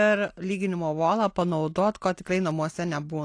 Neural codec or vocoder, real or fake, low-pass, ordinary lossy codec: none; real; 9.9 kHz; AAC, 48 kbps